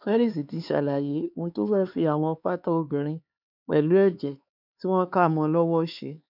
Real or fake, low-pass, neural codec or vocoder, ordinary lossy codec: fake; 5.4 kHz; codec, 16 kHz, 4 kbps, X-Codec, HuBERT features, trained on LibriSpeech; none